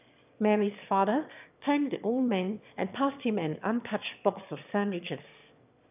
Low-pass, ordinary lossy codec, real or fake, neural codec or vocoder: 3.6 kHz; none; fake; autoencoder, 22.05 kHz, a latent of 192 numbers a frame, VITS, trained on one speaker